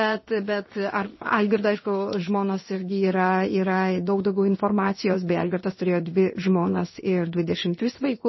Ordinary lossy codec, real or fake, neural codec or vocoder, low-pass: MP3, 24 kbps; fake; codec, 16 kHz in and 24 kHz out, 1 kbps, XY-Tokenizer; 7.2 kHz